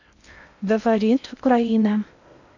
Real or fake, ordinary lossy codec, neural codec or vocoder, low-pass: fake; none; codec, 16 kHz in and 24 kHz out, 0.6 kbps, FocalCodec, streaming, 2048 codes; 7.2 kHz